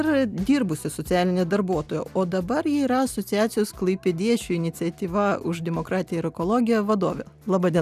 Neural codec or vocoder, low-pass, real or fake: none; 14.4 kHz; real